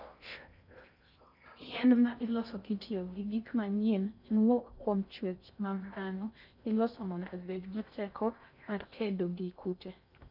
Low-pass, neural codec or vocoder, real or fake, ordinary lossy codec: 5.4 kHz; codec, 16 kHz in and 24 kHz out, 0.6 kbps, FocalCodec, streaming, 4096 codes; fake; none